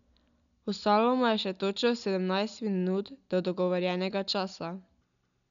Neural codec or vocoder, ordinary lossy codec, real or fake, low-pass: none; none; real; 7.2 kHz